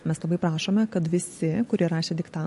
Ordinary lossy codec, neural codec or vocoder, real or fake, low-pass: MP3, 48 kbps; none; real; 14.4 kHz